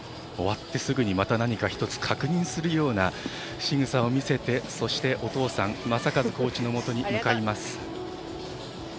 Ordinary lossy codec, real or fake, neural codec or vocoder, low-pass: none; real; none; none